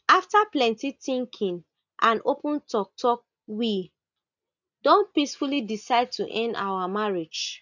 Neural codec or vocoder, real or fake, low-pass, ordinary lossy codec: none; real; 7.2 kHz; none